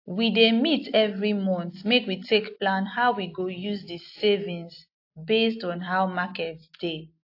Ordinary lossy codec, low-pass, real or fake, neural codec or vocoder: AAC, 32 kbps; 5.4 kHz; real; none